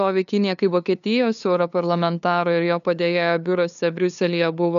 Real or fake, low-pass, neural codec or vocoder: fake; 7.2 kHz; codec, 16 kHz, 2 kbps, FunCodec, trained on LibriTTS, 25 frames a second